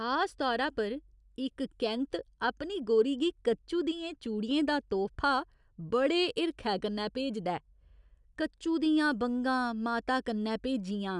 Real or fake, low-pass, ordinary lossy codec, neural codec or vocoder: real; 10.8 kHz; none; none